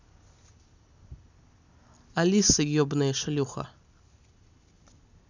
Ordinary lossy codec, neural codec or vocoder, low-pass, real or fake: none; none; 7.2 kHz; real